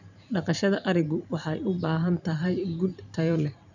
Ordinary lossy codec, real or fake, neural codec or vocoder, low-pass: none; fake; vocoder, 44.1 kHz, 128 mel bands every 512 samples, BigVGAN v2; 7.2 kHz